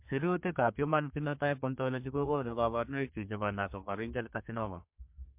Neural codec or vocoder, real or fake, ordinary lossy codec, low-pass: codec, 32 kHz, 1.9 kbps, SNAC; fake; MP3, 32 kbps; 3.6 kHz